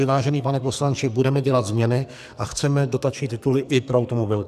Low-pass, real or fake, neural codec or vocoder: 14.4 kHz; fake; codec, 44.1 kHz, 2.6 kbps, SNAC